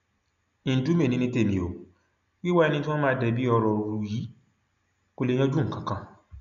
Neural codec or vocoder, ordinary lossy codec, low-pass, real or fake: none; none; 7.2 kHz; real